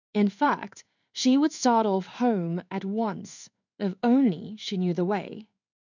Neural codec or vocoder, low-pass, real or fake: codec, 16 kHz in and 24 kHz out, 1 kbps, XY-Tokenizer; 7.2 kHz; fake